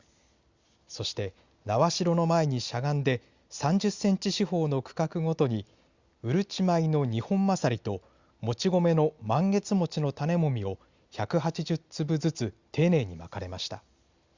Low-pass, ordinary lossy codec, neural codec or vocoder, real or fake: 7.2 kHz; Opus, 64 kbps; none; real